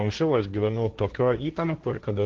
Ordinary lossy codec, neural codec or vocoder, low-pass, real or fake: Opus, 16 kbps; codec, 16 kHz, 1.1 kbps, Voila-Tokenizer; 7.2 kHz; fake